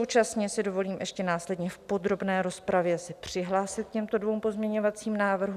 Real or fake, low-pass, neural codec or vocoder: real; 14.4 kHz; none